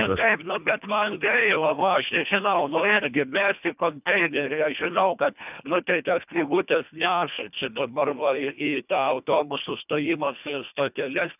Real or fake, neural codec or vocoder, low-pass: fake; codec, 24 kHz, 1.5 kbps, HILCodec; 3.6 kHz